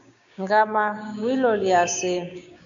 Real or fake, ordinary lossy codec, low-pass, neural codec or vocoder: fake; MP3, 64 kbps; 7.2 kHz; codec, 16 kHz, 6 kbps, DAC